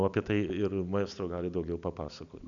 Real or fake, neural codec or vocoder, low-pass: real; none; 7.2 kHz